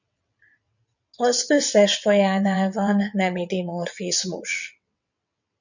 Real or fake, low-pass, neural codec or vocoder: fake; 7.2 kHz; vocoder, 22.05 kHz, 80 mel bands, WaveNeXt